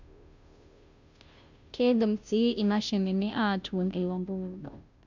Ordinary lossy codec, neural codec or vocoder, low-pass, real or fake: none; codec, 16 kHz, 0.5 kbps, FunCodec, trained on Chinese and English, 25 frames a second; 7.2 kHz; fake